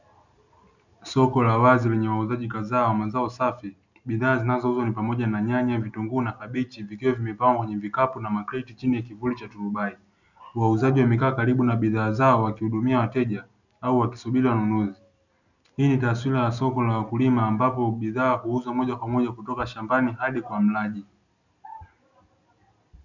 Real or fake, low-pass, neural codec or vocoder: real; 7.2 kHz; none